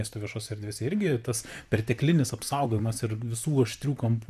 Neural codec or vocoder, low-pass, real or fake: vocoder, 44.1 kHz, 128 mel bands, Pupu-Vocoder; 14.4 kHz; fake